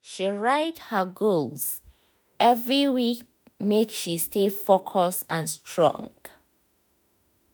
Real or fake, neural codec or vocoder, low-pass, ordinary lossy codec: fake; autoencoder, 48 kHz, 32 numbers a frame, DAC-VAE, trained on Japanese speech; none; none